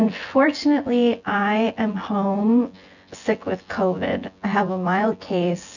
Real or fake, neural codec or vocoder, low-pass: fake; vocoder, 24 kHz, 100 mel bands, Vocos; 7.2 kHz